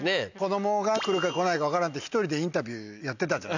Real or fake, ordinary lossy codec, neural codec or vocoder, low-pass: real; none; none; 7.2 kHz